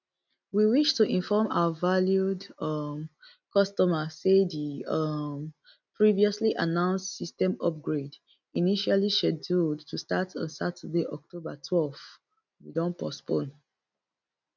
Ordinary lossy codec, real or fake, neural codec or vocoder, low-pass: none; real; none; 7.2 kHz